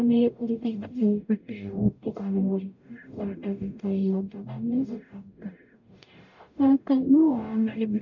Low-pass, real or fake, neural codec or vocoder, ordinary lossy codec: 7.2 kHz; fake; codec, 44.1 kHz, 0.9 kbps, DAC; none